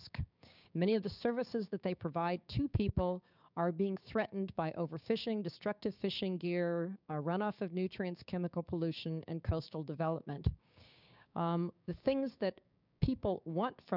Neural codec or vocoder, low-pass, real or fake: codec, 16 kHz, 6 kbps, DAC; 5.4 kHz; fake